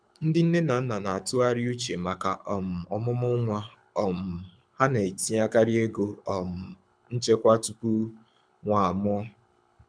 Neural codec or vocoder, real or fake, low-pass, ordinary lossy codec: codec, 24 kHz, 6 kbps, HILCodec; fake; 9.9 kHz; MP3, 96 kbps